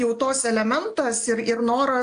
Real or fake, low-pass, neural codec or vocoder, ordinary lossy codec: real; 9.9 kHz; none; AAC, 64 kbps